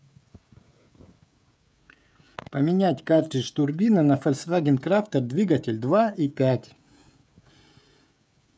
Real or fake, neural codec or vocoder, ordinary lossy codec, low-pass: fake; codec, 16 kHz, 16 kbps, FreqCodec, smaller model; none; none